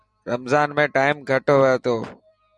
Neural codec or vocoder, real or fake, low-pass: vocoder, 44.1 kHz, 128 mel bands every 256 samples, BigVGAN v2; fake; 10.8 kHz